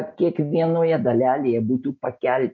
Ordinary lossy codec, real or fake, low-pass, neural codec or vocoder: MP3, 48 kbps; real; 7.2 kHz; none